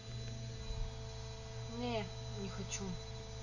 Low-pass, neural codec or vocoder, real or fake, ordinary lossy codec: 7.2 kHz; none; real; none